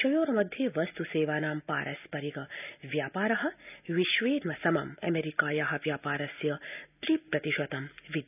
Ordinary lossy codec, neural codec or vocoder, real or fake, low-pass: none; none; real; 3.6 kHz